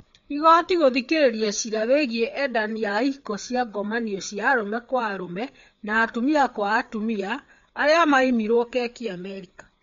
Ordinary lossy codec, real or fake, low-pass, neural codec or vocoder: MP3, 48 kbps; fake; 7.2 kHz; codec, 16 kHz, 4 kbps, FreqCodec, larger model